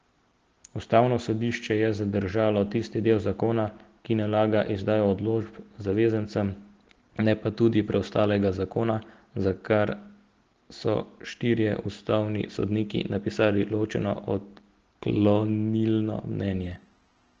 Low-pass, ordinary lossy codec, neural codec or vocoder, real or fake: 7.2 kHz; Opus, 16 kbps; none; real